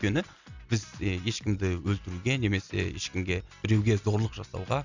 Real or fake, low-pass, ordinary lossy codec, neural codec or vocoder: real; 7.2 kHz; none; none